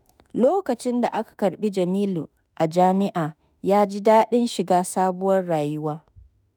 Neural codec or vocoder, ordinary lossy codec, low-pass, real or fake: autoencoder, 48 kHz, 32 numbers a frame, DAC-VAE, trained on Japanese speech; none; none; fake